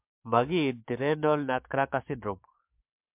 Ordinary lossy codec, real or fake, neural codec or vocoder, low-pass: MP3, 32 kbps; fake; codec, 44.1 kHz, 7.8 kbps, DAC; 3.6 kHz